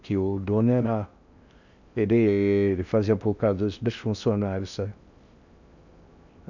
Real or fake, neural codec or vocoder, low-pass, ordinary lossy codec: fake; codec, 16 kHz in and 24 kHz out, 0.6 kbps, FocalCodec, streaming, 4096 codes; 7.2 kHz; none